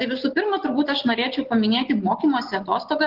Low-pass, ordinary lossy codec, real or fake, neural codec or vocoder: 5.4 kHz; Opus, 16 kbps; real; none